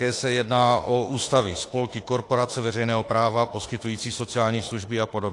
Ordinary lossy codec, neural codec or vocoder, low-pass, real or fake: AAC, 48 kbps; autoencoder, 48 kHz, 32 numbers a frame, DAC-VAE, trained on Japanese speech; 10.8 kHz; fake